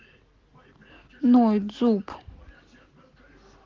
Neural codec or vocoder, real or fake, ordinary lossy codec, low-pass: none; real; Opus, 16 kbps; 7.2 kHz